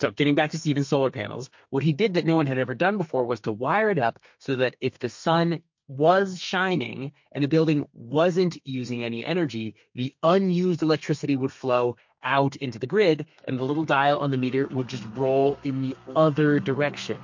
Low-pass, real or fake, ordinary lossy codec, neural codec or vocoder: 7.2 kHz; fake; MP3, 48 kbps; codec, 32 kHz, 1.9 kbps, SNAC